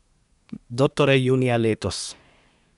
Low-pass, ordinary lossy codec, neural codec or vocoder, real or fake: 10.8 kHz; none; codec, 24 kHz, 1 kbps, SNAC; fake